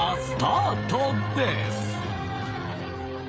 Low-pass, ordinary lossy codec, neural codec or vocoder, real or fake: none; none; codec, 16 kHz, 16 kbps, FreqCodec, smaller model; fake